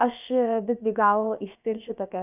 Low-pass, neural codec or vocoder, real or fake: 3.6 kHz; codec, 16 kHz, about 1 kbps, DyCAST, with the encoder's durations; fake